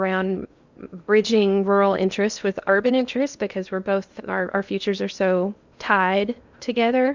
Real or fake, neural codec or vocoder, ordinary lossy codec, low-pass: fake; codec, 16 kHz in and 24 kHz out, 0.8 kbps, FocalCodec, streaming, 65536 codes; Opus, 64 kbps; 7.2 kHz